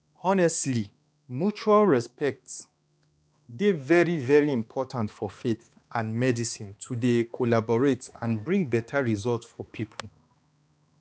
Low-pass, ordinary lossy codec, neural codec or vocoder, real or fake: none; none; codec, 16 kHz, 2 kbps, X-Codec, HuBERT features, trained on balanced general audio; fake